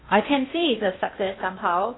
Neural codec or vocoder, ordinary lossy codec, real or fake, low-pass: codec, 16 kHz in and 24 kHz out, 0.8 kbps, FocalCodec, streaming, 65536 codes; AAC, 16 kbps; fake; 7.2 kHz